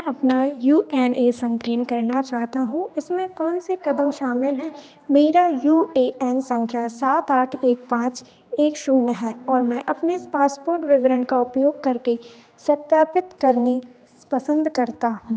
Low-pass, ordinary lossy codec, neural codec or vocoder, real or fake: none; none; codec, 16 kHz, 2 kbps, X-Codec, HuBERT features, trained on general audio; fake